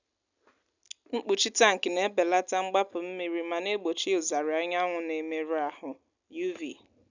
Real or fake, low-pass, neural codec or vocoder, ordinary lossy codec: real; 7.2 kHz; none; none